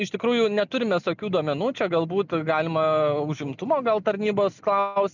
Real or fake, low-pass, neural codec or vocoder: real; 7.2 kHz; none